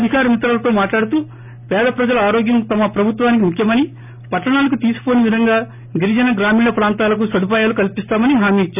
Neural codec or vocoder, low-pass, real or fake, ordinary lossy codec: none; 3.6 kHz; real; none